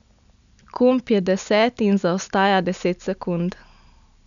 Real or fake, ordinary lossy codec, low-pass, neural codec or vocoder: real; none; 7.2 kHz; none